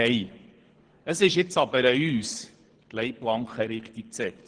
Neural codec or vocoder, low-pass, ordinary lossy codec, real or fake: codec, 24 kHz, 6 kbps, HILCodec; 9.9 kHz; Opus, 16 kbps; fake